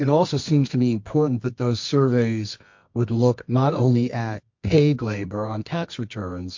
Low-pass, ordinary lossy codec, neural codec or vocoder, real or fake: 7.2 kHz; MP3, 48 kbps; codec, 24 kHz, 0.9 kbps, WavTokenizer, medium music audio release; fake